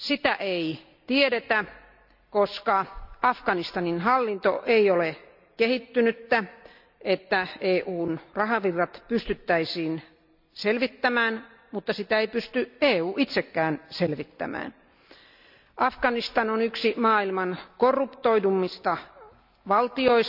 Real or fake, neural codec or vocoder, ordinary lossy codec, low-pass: real; none; none; 5.4 kHz